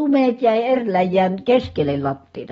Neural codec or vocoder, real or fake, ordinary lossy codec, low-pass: none; real; AAC, 24 kbps; 19.8 kHz